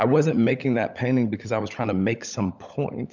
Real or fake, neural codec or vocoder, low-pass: fake; codec, 16 kHz, 16 kbps, FunCodec, trained on LibriTTS, 50 frames a second; 7.2 kHz